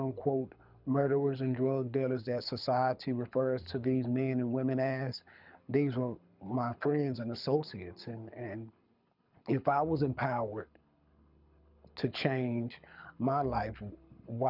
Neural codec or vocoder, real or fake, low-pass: codec, 24 kHz, 6 kbps, HILCodec; fake; 5.4 kHz